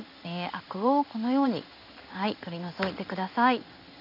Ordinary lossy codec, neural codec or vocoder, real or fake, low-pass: none; codec, 16 kHz in and 24 kHz out, 1 kbps, XY-Tokenizer; fake; 5.4 kHz